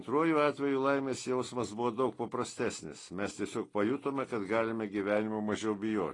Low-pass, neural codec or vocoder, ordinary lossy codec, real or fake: 10.8 kHz; none; AAC, 32 kbps; real